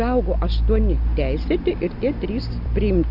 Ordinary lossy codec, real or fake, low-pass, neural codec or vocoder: AAC, 48 kbps; real; 5.4 kHz; none